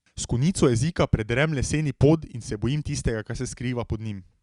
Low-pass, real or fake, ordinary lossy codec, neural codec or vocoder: 10.8 kHz; real; Opus, 64 kbps; none